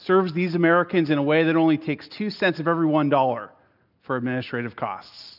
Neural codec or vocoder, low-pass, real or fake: none; 5.4 kHz; real